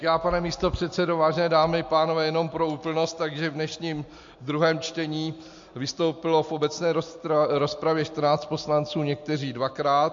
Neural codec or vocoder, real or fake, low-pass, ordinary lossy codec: none; real; 7.2 kHz; MP3, 48 kbps